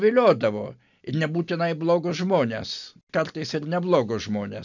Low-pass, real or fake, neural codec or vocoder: 7.2 kHz; real; none